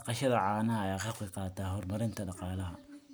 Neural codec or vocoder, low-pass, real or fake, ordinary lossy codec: none; none; real; none